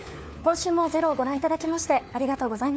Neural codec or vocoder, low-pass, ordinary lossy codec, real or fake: codec, 16 kHz, 4 kbps, FunCodec, trained on LibriTTS, 50 frames a second; none; none; fake